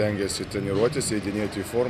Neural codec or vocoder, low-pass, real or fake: none; 14.4 kHz; real